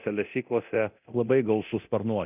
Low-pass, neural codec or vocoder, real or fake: 3.6 kHz; codec, 24 kHz, 0.9 kbps, DualCodec; fake